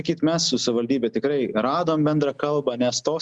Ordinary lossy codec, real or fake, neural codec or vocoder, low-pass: Opus, 64 kbps; real; none; 10.8 kHz